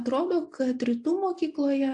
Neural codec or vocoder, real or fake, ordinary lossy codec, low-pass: none; real; AAC, 64 kbps; 10.8 kHz